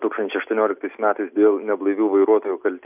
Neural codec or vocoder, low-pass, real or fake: none; 3.6 kHz; real